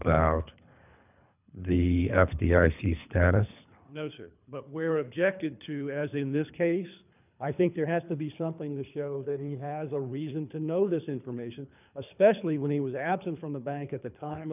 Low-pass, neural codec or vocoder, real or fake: 3.6 kHz; codec, 24 kHz, 3 kbps, HILCodec; fake